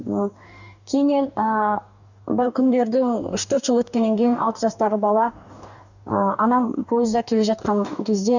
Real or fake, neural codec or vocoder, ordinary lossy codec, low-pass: fake; codec, 44.1 kHz, 2.6 kbps, DAC; none; 7.2 kHz